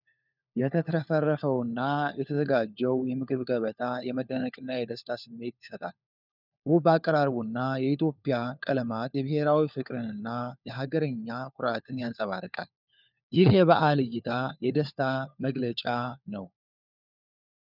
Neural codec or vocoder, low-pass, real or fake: codec, 16 kHz, 4 kbps, FunCodec, trained on LibriTTS, 50 frames a second; 5.4 kHz; fake